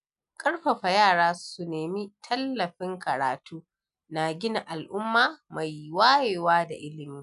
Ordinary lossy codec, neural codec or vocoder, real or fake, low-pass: MP3, 96 kbps; none; real; 10.8 kHz